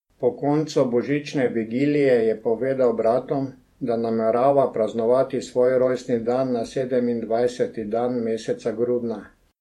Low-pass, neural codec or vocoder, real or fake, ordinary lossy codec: 19.8 kHz; vocoder, 48 kHz, 128 mel bands, Vocos; fake; MP3, 64 kbps